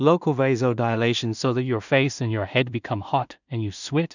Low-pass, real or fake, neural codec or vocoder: 7.2 kHz; fake; codec, 16 kHz in and 24 kHz out, 0.4 kbps, LongCat-Audio-Codec, two codebook decoder